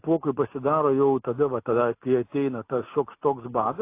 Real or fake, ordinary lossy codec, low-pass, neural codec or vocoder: real; AAC, 24 kbps; 3.6 kHz; none